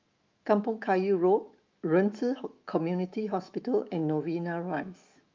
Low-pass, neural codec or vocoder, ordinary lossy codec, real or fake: 7.2 kHz; autoencoder, 48 kHz, 128 numbers a frame, DAC-VAE, trained on Japanese speech; Opus, 24 kbps; fake